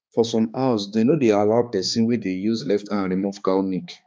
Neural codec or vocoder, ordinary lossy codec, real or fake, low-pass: codec, 16 kHz, 2 kbps, X-Codec, HuBERT features, trained on balanced general audio; none; fake; none